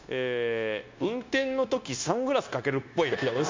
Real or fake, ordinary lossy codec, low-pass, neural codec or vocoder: fake; MP3, 48 kbps; 7.2 kHz; codec, 16 kHz, 0.9 kbps, LongCat-Audio-Codec